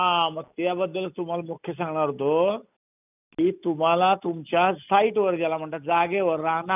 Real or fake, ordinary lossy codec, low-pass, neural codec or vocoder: real; none; 3.6 kHz; none